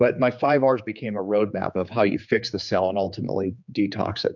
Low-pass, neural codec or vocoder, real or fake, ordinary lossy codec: 7.2 kHz; codec, 16 kHz, 4 kbps, X-Codec, HuBERT features, trained on general audio; fake; MP3, 64 kbps